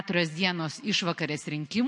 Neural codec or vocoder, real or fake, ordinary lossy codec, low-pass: none; real; MP3, 48 kbps; 10.8 kHz